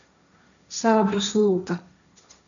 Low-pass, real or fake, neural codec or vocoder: 7.2 kHz; fake; codec, 16 kHz, 1.1 kbps, Voila-Tokenizer